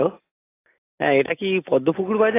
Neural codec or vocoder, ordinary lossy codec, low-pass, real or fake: none; AAC, 16 kbps; 3.6 kHz; real